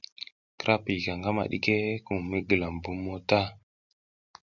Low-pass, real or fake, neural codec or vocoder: 7.2 kHz; real; none